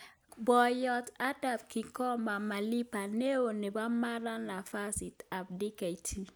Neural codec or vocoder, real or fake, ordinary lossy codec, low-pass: none; real; none; none